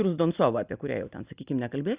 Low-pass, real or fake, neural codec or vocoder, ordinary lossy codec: 3.6 kHz; real; none; Opus, 64 kbps